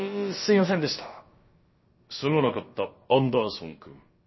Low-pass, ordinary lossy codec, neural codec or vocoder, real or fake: 7.2 kHz; MP3, 24 kbps; codec, 16 kHz, about 1 kbps, DyCAST, with the encoder's durations; fake